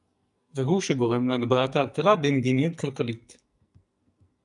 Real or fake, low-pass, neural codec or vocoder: fake; 10.8 kHz; codec, 44.1 kHz, 2.6 kbps, SNAC